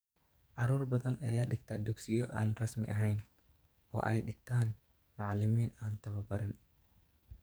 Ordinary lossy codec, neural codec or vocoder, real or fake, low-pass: none; codec, 44.1 kHz, 2.6 kbps, SNAC; fake; none